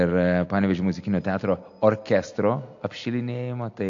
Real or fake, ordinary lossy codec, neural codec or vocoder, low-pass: real; AAC, 64 kbps; none; 7.2 kHz